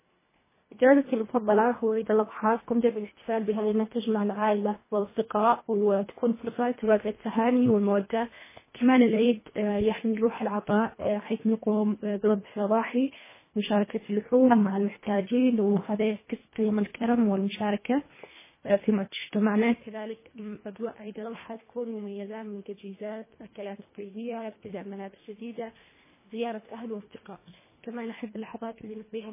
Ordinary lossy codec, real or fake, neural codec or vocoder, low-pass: MP3, 16 kbps; fake; codec, 24 kHz, 1.5 kbps, HILCodec; 3.6 kHz